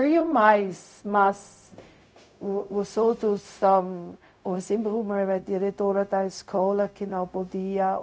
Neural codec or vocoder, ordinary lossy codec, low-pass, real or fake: codec, 16 kHz, 0.4 kbps, LongCat-Audio-Codec; none; none; fake